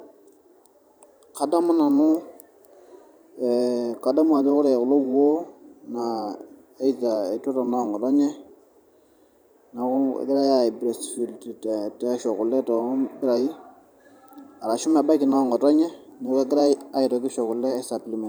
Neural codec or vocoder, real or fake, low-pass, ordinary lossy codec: vocoder, 44.1 kHz, 128 mel bands every 512 samples, BigVGAN v2; fake; none; none